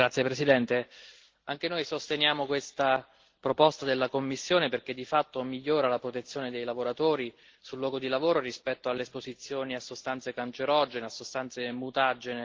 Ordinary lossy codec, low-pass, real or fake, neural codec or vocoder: Opus, 16 kbps; 7.2 kHz; real; none